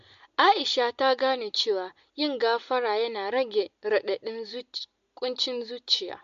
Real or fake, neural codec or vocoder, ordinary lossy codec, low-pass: real; none; MP3, 48 kbps; 7.2 kHz